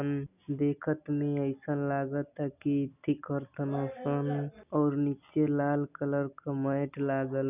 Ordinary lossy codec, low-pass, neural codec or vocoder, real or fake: none; 3.6 kHz; none; real